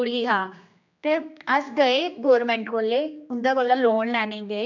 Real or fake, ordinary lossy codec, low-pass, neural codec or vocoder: fake; none; 7.2 kHz; codec, 16 kHz, 2 kbps, X-Codec, HuBERT features, trained on general audio